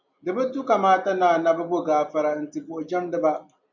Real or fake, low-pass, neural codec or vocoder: real; 7.2 kHz; none